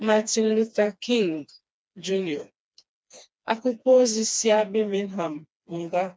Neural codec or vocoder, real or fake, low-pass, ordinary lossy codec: codec, 16 kHz, 2 kbps, FreqCodec, smaller model; fake; none; none